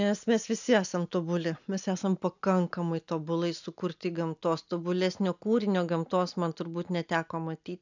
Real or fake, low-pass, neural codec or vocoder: real; 7.2 kHz; none